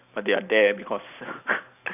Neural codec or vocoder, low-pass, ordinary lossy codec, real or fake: vocoder, 44.1 kHz, 128 mel bands every 256 samples, BigVGAN v2; 3.6 kHz; none; fake